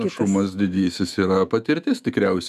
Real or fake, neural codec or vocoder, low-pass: fake; autoencoder, 48 kHz, 128 numbers a frame, DAC-VAE, trained on Japanese speech; 14.4 kHz